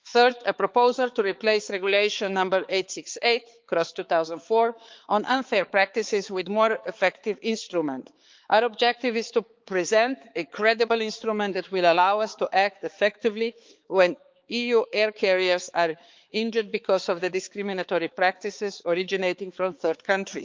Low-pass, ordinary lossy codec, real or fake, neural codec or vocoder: 7.2 kHz; Opus, 24 kbps; fake; codec, 16 kHz, 4 kbps, X-Codec, HuBERT features, trained on balanced general audio